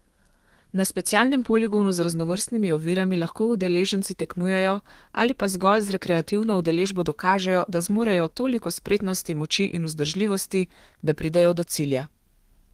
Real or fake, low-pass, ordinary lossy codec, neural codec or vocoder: fake; 14.4 kHz; Opus, 24 kbps; codec, 32 kHz, 1.9 kbps, SNAC